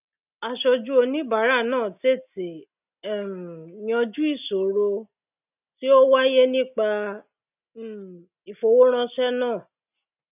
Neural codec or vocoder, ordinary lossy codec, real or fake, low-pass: none; none; real; 3.6 kHz